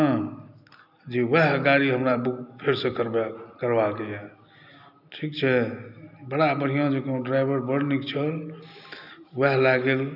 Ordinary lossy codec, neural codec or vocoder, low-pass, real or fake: none; none; 5.4 kHz; real